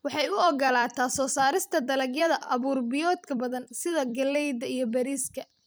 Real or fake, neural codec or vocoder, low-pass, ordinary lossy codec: fake; vocoder, 44.1 kHz, 128 mel bands every 256 samples, BigVGAN v2; none; none